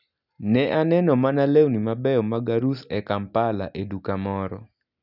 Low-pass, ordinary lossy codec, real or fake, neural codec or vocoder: 5.4 kHz; none; real; none